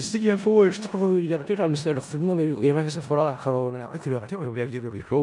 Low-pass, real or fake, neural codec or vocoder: 10.8 kHz; fake; codec, 16 kHz in and 24 kHz out, 0.4 kbps, LongCat-Audio-Codec, four codebook decoder